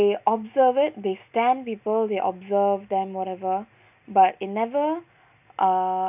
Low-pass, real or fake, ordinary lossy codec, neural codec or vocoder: 3.6 kHz; real; none; none